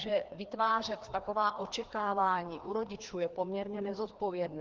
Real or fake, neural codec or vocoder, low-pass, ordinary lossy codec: fake; codec, 16 kHz, 2 kbps, FreqCodec, larger model; 7.2 kHz; Opus, 16 kbps